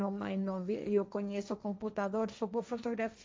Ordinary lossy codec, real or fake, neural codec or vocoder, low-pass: none; fake; codec, 16 kHz, 1.1 kbps, Voila-Tokenizer; none